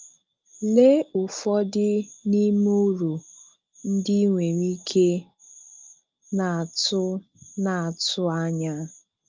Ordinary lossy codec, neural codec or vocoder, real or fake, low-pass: Opus, 24 kbps; none; real; 7.2 kHz